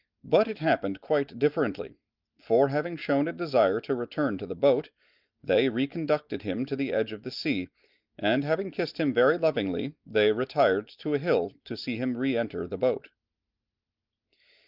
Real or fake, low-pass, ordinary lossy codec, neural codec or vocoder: real; 5.4 kHz; Opus, 24 kbps; none